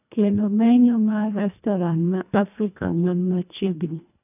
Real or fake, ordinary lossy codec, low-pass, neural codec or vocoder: fake; none; 3.6 kHz; codec, 24 kHz, 1.5 kbps, HILCodec